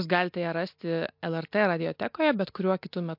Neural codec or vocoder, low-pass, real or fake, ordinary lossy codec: none; 5.4 kHz; real; MP3, 48 kbps